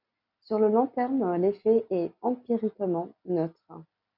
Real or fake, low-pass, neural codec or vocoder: real; 5.4 kHz; none